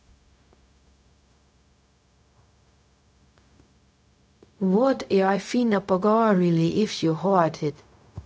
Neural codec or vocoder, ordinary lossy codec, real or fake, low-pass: codec, 16 kHz, 0.4 kbps, LongCat-Audio-Codec; none; fake; none